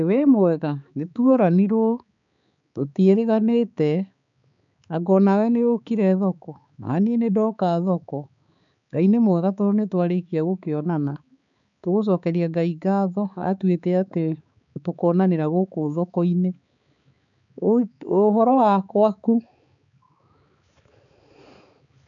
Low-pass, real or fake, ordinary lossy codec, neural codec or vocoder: 7.2 kHz; fake; none; codec, 16 kHz, 4 kbps, X-Codec, HuBERT features, trained on balanced general audio